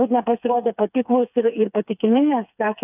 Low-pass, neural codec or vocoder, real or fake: 3.6 kHz; codec, 16 kHz, 4 kbps, FreqCodec, smaller model; fake